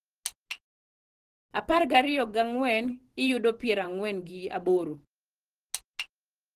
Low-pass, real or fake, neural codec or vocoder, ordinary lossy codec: 14.4 kHz; fake; vocoder, 44.1 kHz, 128 mel bands every 512 samples, BigVGAN v2; Opus, 16 kbps